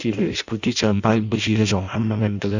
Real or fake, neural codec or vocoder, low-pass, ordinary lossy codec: fake; codec, 16 kHz in and 24 kHz out, 0.6 kbps, FireRedTTS-2 codec; 7.2 kHz; none